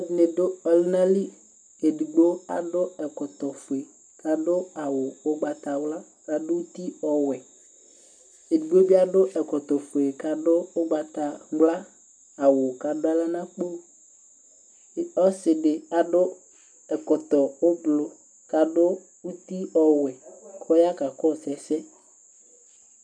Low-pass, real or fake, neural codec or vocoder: 9.9 kHz; real; none